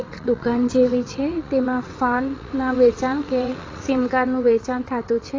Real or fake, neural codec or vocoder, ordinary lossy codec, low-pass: fake; vocoder, 22.05 kHz, 80 mel bands, WaveNeXt; AAC, 32 kbps; 7.2 kHz